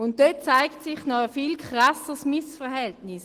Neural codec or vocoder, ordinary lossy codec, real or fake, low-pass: none; Opus, 16 kbps; real; 14.4 kHz